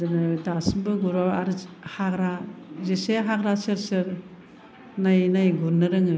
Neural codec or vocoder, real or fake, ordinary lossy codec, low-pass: none; real; none; none